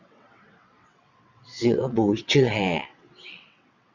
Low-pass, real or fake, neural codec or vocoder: 7.2 kHz; fake; vocoder, 22.05 kHz, 80 mel bands, WaveNeXt